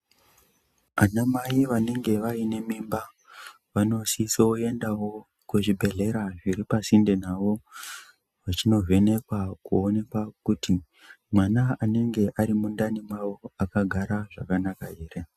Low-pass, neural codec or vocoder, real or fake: 14.4 kHz; none; real